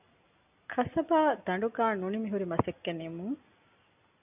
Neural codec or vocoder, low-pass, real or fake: none; 3.6 kHz; real